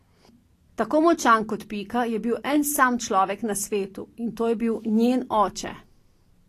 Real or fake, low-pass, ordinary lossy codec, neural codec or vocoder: real; 14.4 kHz; AAC, 48 kbps; none